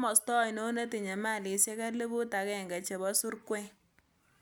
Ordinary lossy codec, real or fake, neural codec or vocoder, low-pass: none; real; none; none